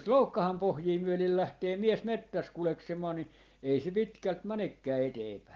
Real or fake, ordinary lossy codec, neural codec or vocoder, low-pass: real; Opus, 16 kbps; none; 7.2 kHz